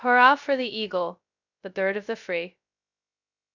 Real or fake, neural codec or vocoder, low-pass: fake; codec, 16 kHz, 0.2 kbps, FocalCodec; 7.2 kHz